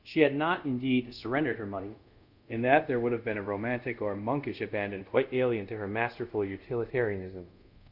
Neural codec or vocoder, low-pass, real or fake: codec, 24 kHz, 0.5 kbps, DualCodec; 5.4 kHz; fake